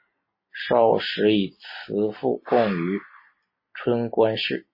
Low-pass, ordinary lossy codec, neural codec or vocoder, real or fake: 5.4 kHz; MP3, 24 kbps; none; real